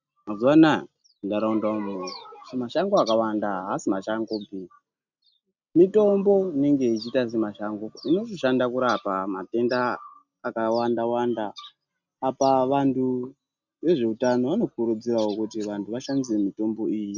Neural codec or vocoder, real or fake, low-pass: none; real; 7.2 kHz